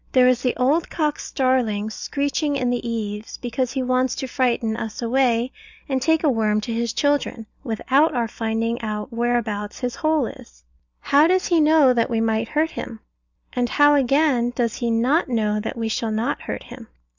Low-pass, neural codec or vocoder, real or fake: 7.2 kHz; none; real